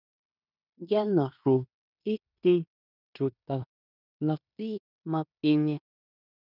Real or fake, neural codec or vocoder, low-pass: fake; codec, 16 kHz in and 24 kHz out, 0.9 kbps, LongCat-Audio-Codec, fine tuned four codebook decoder; 5.4 kHz